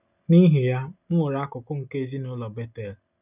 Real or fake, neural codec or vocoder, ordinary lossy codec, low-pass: real; none; none; 3.6 kHz